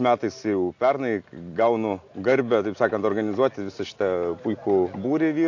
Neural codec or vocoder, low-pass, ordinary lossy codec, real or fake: none; 7.2 kHz; AAC, 48 kbps; real